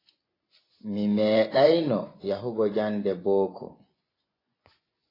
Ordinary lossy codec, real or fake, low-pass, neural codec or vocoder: AAC, 24 kbps; fake; 5.4 kHz; vocoder, 24 kHz, 100 mel bands, Vocos